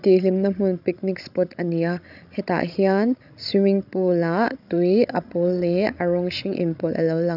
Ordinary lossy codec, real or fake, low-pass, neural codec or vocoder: none; fake; 5.4 kHz; codec, 16 kHz, 16 kbps, FreqCodec, larger model